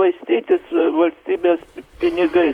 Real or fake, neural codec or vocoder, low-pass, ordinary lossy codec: fake; vocoder, 44.1 kHz, 128 mel bands, Pupu-Vocoder; 19.8 kHz; Opus, 64 kbps